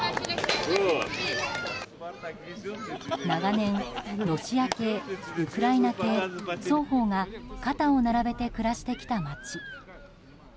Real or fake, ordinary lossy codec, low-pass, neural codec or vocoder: real; none; none; none